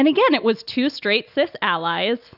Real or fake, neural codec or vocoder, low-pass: real; none; 5.4 kHz